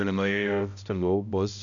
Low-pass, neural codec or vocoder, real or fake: 7.2 kHz; codec, 16 kHz, 0.5 kbps, X-Codec, HuBERT features, trained on balanced general audio; fake